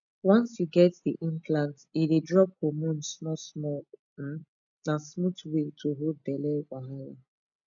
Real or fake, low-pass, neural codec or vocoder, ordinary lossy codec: real; 7.2 kHz; none; none